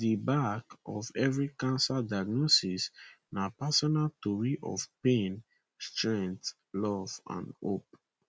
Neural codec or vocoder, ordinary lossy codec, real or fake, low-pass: none; none; real; none